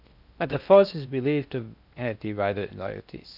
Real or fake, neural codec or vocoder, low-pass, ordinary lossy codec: fake; codec, 16 kHz in and 24 kHz out, 0.6 kbps, FocalCodec, streaming, 4096 codes; 5.4 kHz; none